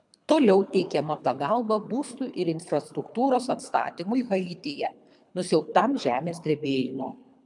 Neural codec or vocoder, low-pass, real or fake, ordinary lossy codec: codec, 24 kHz, 3 kbps, HILCodec; 10.8 kHz; fake; MP3, 96 kbps